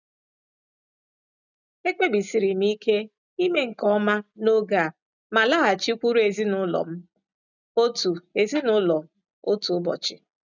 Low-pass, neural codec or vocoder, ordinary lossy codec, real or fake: 7.2 kHz; none; none; real